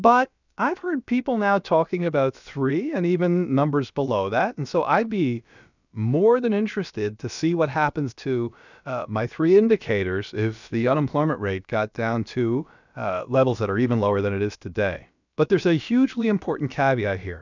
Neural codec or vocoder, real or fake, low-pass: codec, 16 kHz, about 1 kbps, DyCAST, with the encoder's durations; fake; 7.2 kHz